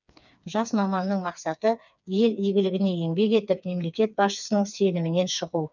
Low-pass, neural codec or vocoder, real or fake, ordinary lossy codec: 7.2 kHz; codec, 16 kHz, 4 kbps, FreqCodec, smaller model; fake; none